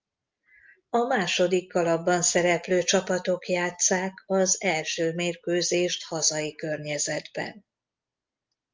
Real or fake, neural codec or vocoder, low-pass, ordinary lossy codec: real; none; 7.2 kHz; Opus, 32 kbps